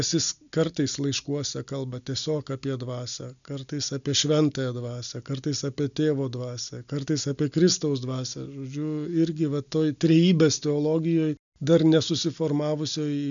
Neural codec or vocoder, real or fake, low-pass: none; real; 7.2 kHz